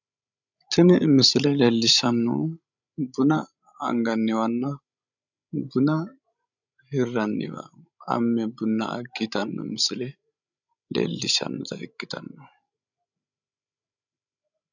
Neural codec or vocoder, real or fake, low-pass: codec, 16 kHz, 16 kbps, FreqCodec, larger model; fake; 7.2 kHz